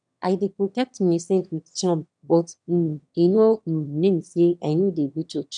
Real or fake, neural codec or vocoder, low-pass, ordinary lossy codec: fake; autoencoder, 22.05 kHz, a latent of 192 numbers a frame, VITS, trained on one speaker; 9.9 kHz; none